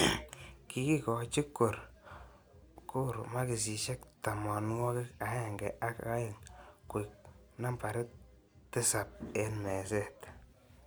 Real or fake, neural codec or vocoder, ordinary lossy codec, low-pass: real; none; none; none